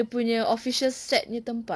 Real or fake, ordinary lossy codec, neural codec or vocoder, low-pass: real; none; none; none